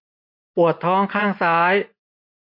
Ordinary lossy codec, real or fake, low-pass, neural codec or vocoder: none; fake; 5.4 kHz; vocoder, 24 kHz, 100 mel bands, Vocos